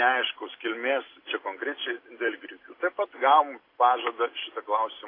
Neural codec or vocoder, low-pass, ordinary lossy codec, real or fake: none; 5.4 kHz; AAC, 24 kbps; real